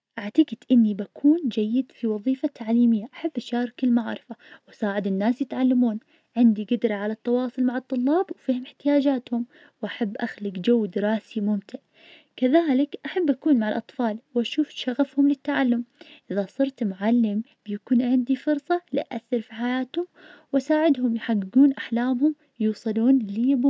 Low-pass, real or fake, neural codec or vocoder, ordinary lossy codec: none; real; none; none